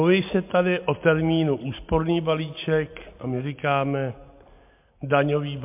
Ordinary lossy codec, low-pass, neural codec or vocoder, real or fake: MP3, 32 kbps; 3.6 kHz; none; real